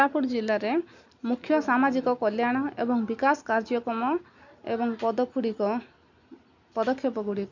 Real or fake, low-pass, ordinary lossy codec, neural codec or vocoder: real; 7.2 kHz; none; none